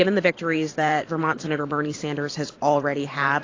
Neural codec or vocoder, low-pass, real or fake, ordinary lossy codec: vocoder, 44.1 kHz, 128 mel bands every 512 samples, BigVGAN v2; 7.2 kHz; fake; AAC, 32 kbps